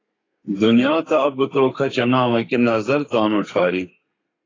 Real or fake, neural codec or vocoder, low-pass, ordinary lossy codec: fake; codec, 32 kHz, 1.9 kbps, SNAC; 7.2 kHz; AAC, 32 kbps